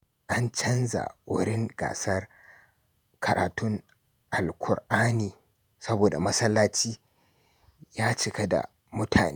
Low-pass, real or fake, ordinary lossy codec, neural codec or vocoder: none; fake; none; vocoder, 48 kHz, 128 mel bands, Vocos